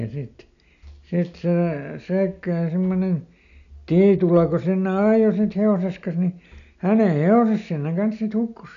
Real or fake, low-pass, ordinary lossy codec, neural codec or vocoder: real; 7.2 kHz; none; none